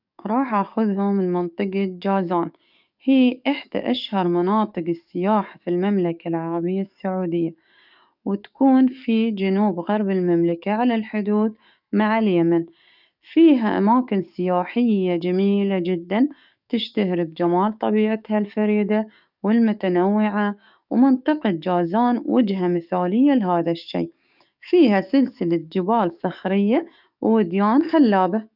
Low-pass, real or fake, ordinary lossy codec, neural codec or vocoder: 5.4 kHz; fake; none; codec, 44.1 kHz, 7.8 kbps, DAC